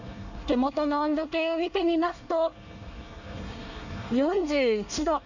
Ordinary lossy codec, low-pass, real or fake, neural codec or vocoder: none; 7.2 kHz; fake; codec, 24 kHz, 1 kbps, SNAC